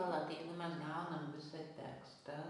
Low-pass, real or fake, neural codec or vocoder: 10.8 kHz; real; none